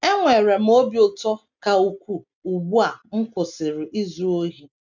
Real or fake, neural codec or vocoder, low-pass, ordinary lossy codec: real; none; 7.2 kHz; none